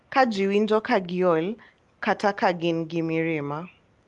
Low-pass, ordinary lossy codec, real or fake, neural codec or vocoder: 10.8 kHz; Opus, 32 kbps; real; none